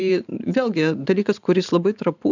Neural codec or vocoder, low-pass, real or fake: vocoder, 44.1 kHz, 128 mel bands every 512 samples, BigVGAN v2; 7.2 kHz; fake